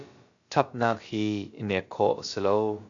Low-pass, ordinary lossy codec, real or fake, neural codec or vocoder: 7.2 kHz; AAC, 48 kbps; fake; codec, 16 kHz, about 1 kbps, DyCAST, with the encoder's durations